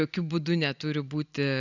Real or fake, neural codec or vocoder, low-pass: real; none; 7.2 kHz